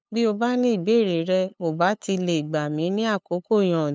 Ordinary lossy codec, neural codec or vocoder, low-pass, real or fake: none; codec, 16 kHz, 8 kbps, FunCodec, trained on LibriTTS, 25 frames a second; none; fake